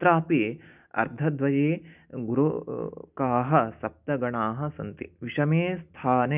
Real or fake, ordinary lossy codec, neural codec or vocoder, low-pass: real; none; none; 3.6 kHz